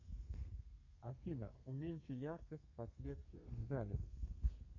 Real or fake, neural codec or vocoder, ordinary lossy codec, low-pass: fake; codec, 32 kHz, 1.9 kbps, SNAC; MP3, 64 kbps; 7.2 kHz